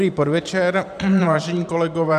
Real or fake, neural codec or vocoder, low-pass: fake; vocoder, 44.1 kHz, 128 mel bands every 512 samples, BigVGAN v2; 14.4 kHz